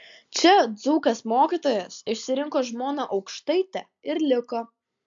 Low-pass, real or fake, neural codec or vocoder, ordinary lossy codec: 7.2 kHz; real; none; MP3, 64 kbps